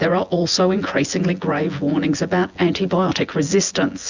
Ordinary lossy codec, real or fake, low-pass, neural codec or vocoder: Opus, 64 kbps; fake; 7.2 kHz; vocoder, 24 kHz, 100 mel bands, Vocos